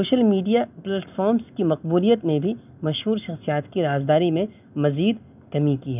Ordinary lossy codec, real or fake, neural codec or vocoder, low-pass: none; real; none; 3.6 kHz